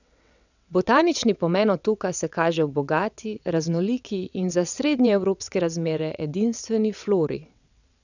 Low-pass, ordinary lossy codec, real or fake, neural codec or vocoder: 7.2 kHz; none; fake; vocoder, 22.05 kHz, 80 mel bands, WaveNeXt